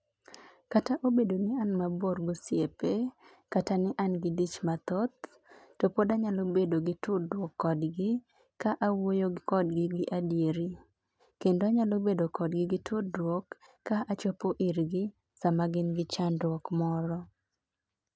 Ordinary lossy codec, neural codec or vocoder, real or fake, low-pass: none; none; real; none